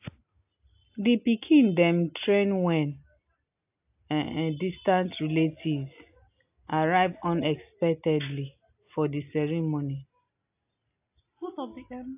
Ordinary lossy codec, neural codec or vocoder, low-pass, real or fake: AAC, 32 kbps; none; 3.6 kHz; real